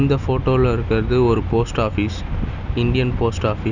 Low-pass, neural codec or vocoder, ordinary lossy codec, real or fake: 7.2 kHz; none; none; real